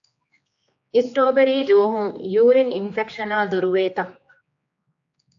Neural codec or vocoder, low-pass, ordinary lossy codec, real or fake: codec, 16 kHz, 2 kbps, X-Codec, HuBERT features, trained on general audio; 7.2 kHz; AAC, 64 kbps; fake